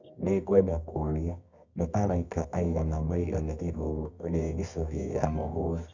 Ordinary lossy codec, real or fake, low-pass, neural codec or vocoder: none; fake; 7.2 kHz; codec, 24 kHz, 0.9 kbps, WavTokenizer, medium music audio release